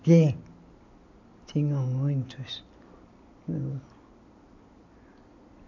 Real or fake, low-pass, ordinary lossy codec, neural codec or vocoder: real; 7.2 kHz; none; none